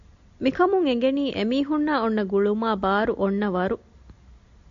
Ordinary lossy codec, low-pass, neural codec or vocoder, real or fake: AAC, 64 kbps; 7.2 kHz; none; real